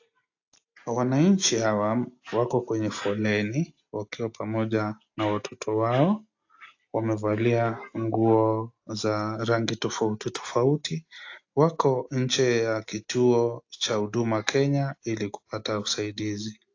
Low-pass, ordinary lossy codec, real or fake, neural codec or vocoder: 7.2 kHz; AAC, 48 kbps; real; none